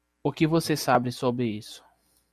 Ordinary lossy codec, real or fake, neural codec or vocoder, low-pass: MP3, 96 kbps; real; none; 14.4 kHz